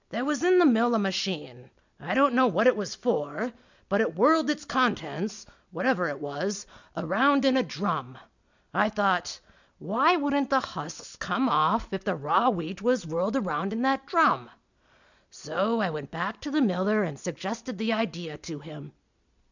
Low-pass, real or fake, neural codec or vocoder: 7.2 kHz; real; none